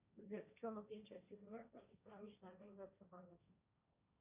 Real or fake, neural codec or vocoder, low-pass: fake; codec, 16 kHz, 1.1 kbps, Voila-Tokenizer; 3.6 kHz